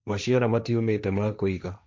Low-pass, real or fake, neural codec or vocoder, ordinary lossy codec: none; fake; codec, 16 kHz, 1.1 kbps, Voila-Tokenizer; none